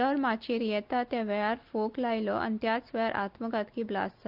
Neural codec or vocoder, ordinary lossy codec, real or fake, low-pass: none; Opus, 32 kbps; real; 5.4 kHz